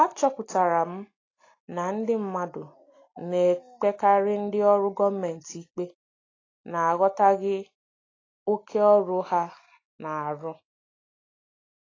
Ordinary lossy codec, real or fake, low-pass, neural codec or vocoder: AAC, 32 kbps; real; 7.2 kHz; none